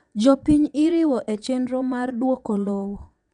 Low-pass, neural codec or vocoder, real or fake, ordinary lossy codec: 9.9 kHz; vocoder, 22.05 kHz, 80 mel bands, Vocos; fake; none